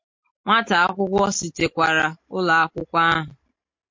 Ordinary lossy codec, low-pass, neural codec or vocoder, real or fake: MP3, 48 kbps; 7.2 kHz; none; real